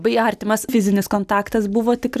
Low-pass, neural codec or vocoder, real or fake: 14.4 kHz; none; real